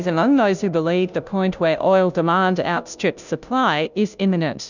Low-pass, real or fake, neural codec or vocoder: 7.2 kHz; fake; codec, 16 kHz, 0.5 kbps, FunCodec, trained on Chinese and English, 25 frames a second